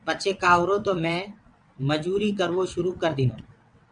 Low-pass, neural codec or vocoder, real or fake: 9.9 kHz; vocoder, 22.05 kHz, 80 mel bands, WaveNeXt; fake